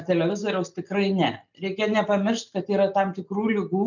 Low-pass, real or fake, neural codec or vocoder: 7.2 kHz; real; none